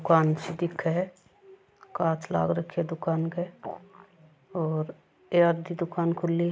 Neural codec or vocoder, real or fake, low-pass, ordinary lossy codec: none; real; none; none